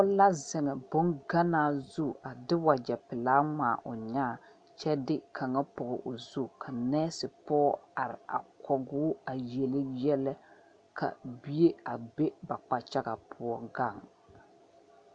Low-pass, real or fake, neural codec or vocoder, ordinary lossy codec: 9.9 kHz; real; none; AAC, 64 kbps